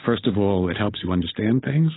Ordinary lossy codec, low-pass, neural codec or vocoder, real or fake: AAC, 16 kbps; 7.2 kHz; codec, 16 kHz, 8 kbps, FunCodec, trained on Chinese and English, 25 frames a second; fake